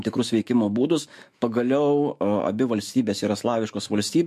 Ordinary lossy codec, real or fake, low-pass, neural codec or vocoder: MP3, 64 kbps; fake; 14.4 kHz; autoencoder, 48 kHz, 128 numbers a frame, DAC-VAE, trained on Japanese speech